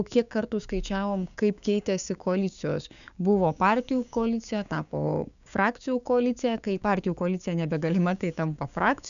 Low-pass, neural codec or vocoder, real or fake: 7.2 kHz; codec, 16 kHz, 6 kbps, DAC; fake